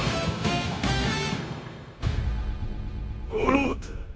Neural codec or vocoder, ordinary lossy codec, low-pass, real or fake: codec, 16 kHz, 0.9 kbps, LongCat-Audio-Codec; none; none; fake